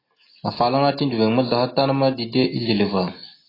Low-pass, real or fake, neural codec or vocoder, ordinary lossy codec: 5.4 kHz; real; none; AAC, 24 kbps